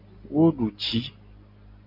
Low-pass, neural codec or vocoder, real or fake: 5.4 kHz; none; real